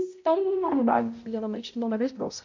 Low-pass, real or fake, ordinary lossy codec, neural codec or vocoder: 7.2 kHz; fake; MP3, 48 kbps; codec, 16 kHz, 0.5 kbps, X-Codec, HuBERT features, trained on balanced general audio